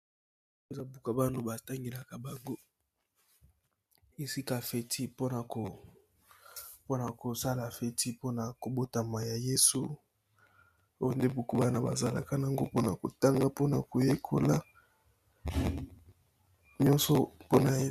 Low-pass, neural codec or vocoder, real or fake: 14.4 kHz; none; real